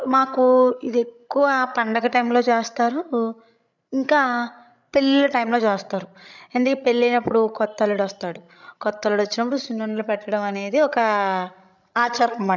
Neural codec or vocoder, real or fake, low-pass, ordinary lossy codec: codec, 16 kHz, 16 kbps, FreqCodec, larger model; fake; 7.2 kHz; none